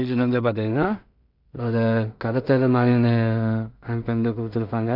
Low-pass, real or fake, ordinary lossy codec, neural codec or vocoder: 5.4 kHz; fake; none; codec, 16 kHz in and 24 kHz out, 0.4 kbps, LongCat-Audio-Codec, two codebook decoder